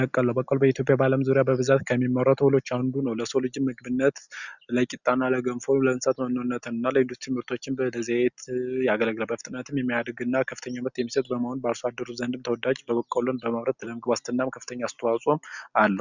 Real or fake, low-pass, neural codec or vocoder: real; 7.2 kHz; none